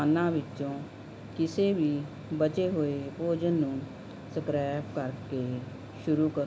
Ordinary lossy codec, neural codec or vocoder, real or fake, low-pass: none; none; real; none